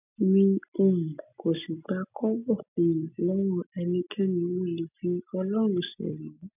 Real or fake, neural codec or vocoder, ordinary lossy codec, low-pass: real; none; none; 3.6 kHz